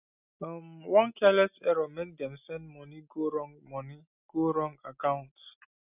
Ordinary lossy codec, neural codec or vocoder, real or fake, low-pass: none; none; real; 3.6 kHz